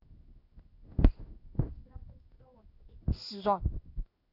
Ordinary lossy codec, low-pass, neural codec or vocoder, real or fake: AAC, 48 kbps; 5.4 kHz; codec, 16 kHz in and 24 kHz out, 1 kbps, XY-Tokenizer; fake